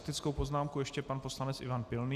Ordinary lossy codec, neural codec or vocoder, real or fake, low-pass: Opus, 64 kbps; none; real; 14.4 kHz